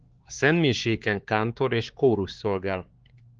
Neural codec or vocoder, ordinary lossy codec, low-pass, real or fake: codec, 16 kHz, 4 kbps, X-Codec, HuBERT features, trained on LibriSpeech; Opus, 16 kbps; 7.2 kHz; fake